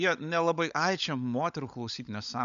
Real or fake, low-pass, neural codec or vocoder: fake; 7.2 kHz; codec, 16 kHz, 16 kbps, FunCodec, trained on LibriTTS, 50 frames a second